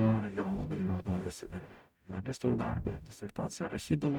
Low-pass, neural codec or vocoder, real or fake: 19.8 kHz; codec, 44.1 kHz, 0.9 kbps, DAC; fake